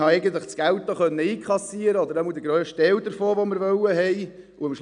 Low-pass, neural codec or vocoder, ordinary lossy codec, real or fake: 9.9 kHz; none; none; real